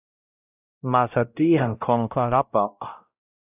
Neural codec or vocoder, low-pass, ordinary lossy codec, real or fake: codec, 16 kHz, 0.5 kbps, X-Codec, WavLM features, trained on Multilingual LibriSpeech; 3.6 kHz; AAC, 32 kbps; fake